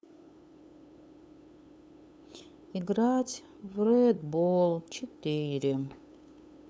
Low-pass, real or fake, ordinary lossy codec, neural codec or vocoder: none; fake; none; codec, 16 kHz, 8 kbps, FunCodec, trained on LibriTTS, 25 frames a second